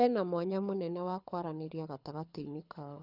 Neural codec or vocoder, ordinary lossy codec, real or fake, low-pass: codec, 24 kHz, 6 kbps, HILCodec; none; fake; 5.4 kHz